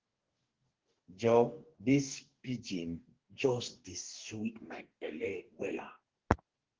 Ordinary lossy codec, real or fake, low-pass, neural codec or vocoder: Opus, 16 kbps; fake; 7.2 kHz; codec, 44.1 kHz, 2.6 kbps, DAC